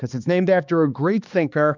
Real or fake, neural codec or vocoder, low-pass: fake; codec, 16 kHz, 2 kbps, X-Codec, HuBERT features, trained on balanced general audio; 7.2 kHz